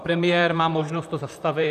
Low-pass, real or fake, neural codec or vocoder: 14.4 kHz; fake; vocoder, 44.1 kHz, 128 mel bands, Pupu-Vocoder